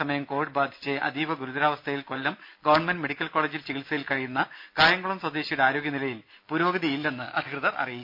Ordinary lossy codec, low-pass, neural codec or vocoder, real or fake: MP3, 48 kbps; 5.4 kHz; none; real